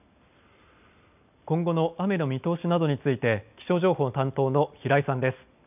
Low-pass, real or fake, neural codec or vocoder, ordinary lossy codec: 3.6 kHz; real; none; none